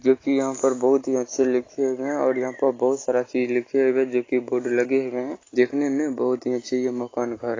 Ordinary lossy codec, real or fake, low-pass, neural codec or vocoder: AAC, 32 kbps; real; 7.2 kHz; none